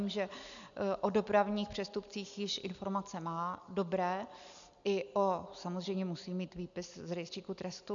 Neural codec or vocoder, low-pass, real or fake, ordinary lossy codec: none; 7.2 kHz; real; MP3, 96 kbps